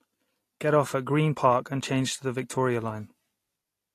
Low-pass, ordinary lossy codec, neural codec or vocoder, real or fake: 14.4 kHz; AAC, 48 kbps; none; real